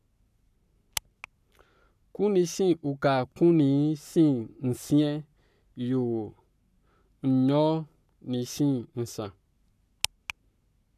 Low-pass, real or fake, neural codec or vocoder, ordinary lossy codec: 14.4 kHz; fake; codec, 44.1 kHz, 7.8 kbps, Pupu-Codec; none